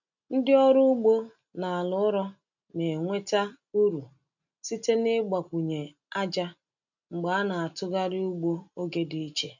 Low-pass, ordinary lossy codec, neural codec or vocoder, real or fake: 7.2 kHz; none; none; real